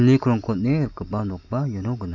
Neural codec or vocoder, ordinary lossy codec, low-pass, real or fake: none; none; 7.2 kHz; real